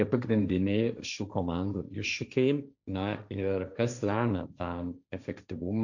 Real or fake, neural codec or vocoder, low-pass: fake; codec, 16 kHz, 1.1 kbps, Voila-Tokenizer; 7.2 kHz